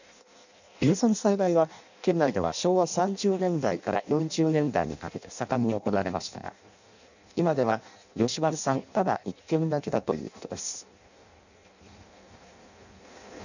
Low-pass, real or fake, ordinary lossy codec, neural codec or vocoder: 7.2 kHz; fake; none; codec, 16 kHz in and 24 kHz out, 0.6 kbps, FireRedTTS-2 codec